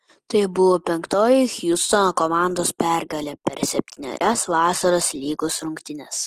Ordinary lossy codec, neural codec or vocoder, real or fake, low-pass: Opus, 16 kbps; none; real; 14.4 kHz